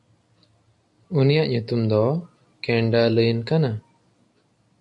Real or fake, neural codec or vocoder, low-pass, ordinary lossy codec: real; none; 10.8 kHz; AAC, 64 kbps